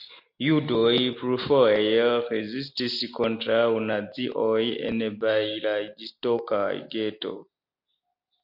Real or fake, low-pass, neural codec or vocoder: real; 5.4 kHz; none